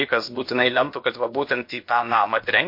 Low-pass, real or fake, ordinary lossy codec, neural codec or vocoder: 5.4 kHz; fake; MP3, 32 kbps; codec, 16 kHz, about 1 kbps, DyCAST, with the encoder's durations